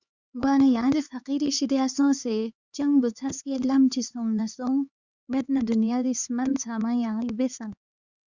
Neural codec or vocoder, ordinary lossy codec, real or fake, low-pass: codec, 16 kHz, 4 kbps, X-Codec, HuBERT features, trained on LibriSpeech; Opus, 64 kbps; fake; 7.2 kHz